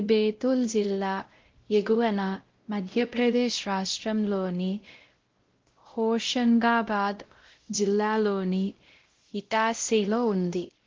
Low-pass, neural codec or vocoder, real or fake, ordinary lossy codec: 7.2 kHz; codec, 16 kHz, 0.5 kbps, X-Codec, WavLM features, trained on Multilingual LibriSpeech; fake; Opus, 16 kbps